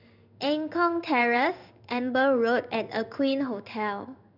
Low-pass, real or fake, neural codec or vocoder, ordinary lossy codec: 5.4 kHz; fake; codec, 16 kHz in and 24 kHz out, 1 kbps, XY-Tokenizer; none